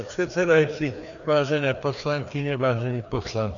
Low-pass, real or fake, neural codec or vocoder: 7.2 kHz; fake; codec, 16 kHz, 2 kbps, FreqCodec, larger model